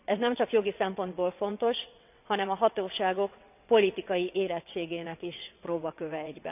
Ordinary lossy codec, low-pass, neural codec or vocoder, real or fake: none; 3.6 kHz; vocoder, 22.05 kHz, 80 mel bands, WaveNeXt; fake